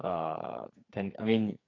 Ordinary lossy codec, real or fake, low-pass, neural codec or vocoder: AAC, 32 kbps; fake; 7.2 kHz; codec, 44.1 kHz, 2.6 kbps, SNAC